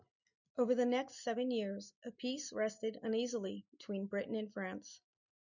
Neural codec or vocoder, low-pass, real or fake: none; 7.2 kHz; real